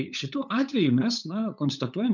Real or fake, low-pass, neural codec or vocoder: fake; 7.2 kHz; codec, 16 kHz, 8 kbps, FunCodec, trained on LibriTTS, 25 frames a second